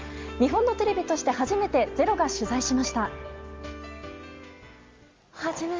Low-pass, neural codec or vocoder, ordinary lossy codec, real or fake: 7.2 kHz; none; Opus, 32 kbps; real